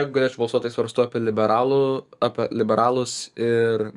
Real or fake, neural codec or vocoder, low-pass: fake; codec, 44.1 kHz, 7.8 kbps, DAC; 10.8 kHz